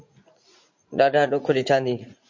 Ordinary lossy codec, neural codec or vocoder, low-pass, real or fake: MP3, 48 kbps; vocoder, 44.1 kHz, 80 mel bands, Vocos; 7.2 kHz; fake